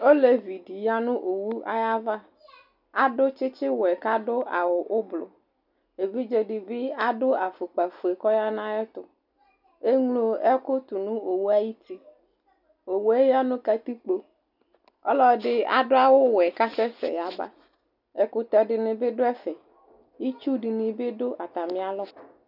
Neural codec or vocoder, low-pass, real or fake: none; 5.4 kHz; real